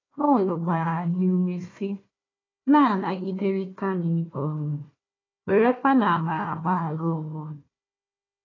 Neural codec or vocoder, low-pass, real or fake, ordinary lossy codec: codec, 16 kHz, 1 kbps, FunCodec, trained on Chinese and English, 50 frames a second; 7.2 kHz; fake; AAC, 32 kbps